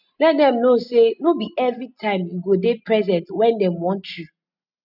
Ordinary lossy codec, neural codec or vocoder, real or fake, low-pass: none; none; real; 5.4 kHz